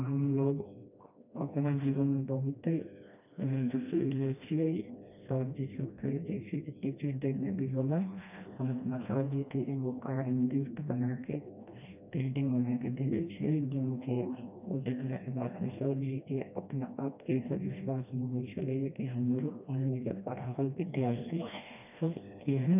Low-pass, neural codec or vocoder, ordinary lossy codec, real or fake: 3.6 kHz; codec, 16 kHz, 1 kbps, FreqCodec, smaller model; none; fake